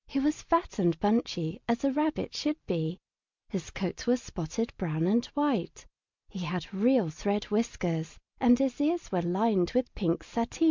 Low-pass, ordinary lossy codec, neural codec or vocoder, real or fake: 7.2 kHz; MP3, 64 kbps; none; real